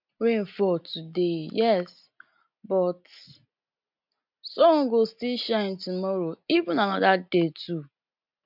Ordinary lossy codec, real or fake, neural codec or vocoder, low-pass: MP3, 48 kbps; real; none; 5.4 kHz